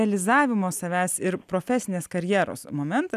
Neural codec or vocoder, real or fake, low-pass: none; real; 14.4 kHz